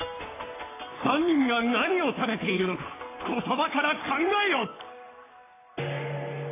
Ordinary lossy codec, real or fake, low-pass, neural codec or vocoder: AAC, 16 kbps; fake; 3.6 kHz; codec, 44.1 kHz, 3.4 kbps, Pupu-Codec